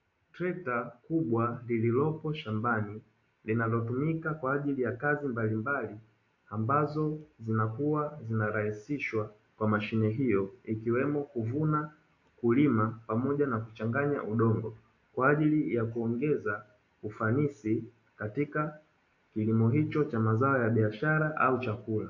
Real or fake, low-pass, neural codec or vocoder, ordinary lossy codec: real; 7.2 kHz; none; AAC, 48 kbps